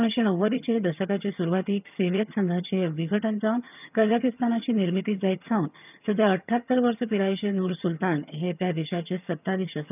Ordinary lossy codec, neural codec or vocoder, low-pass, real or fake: none; vocoder, 22.05 kHz, 80 mel bands, HiFi-GAN; 3.6 kHz; fake